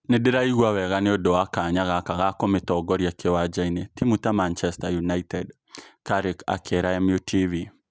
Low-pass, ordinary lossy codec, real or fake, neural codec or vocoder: none; none; real; none